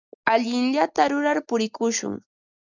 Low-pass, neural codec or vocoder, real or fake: 7.2 kHz; none; real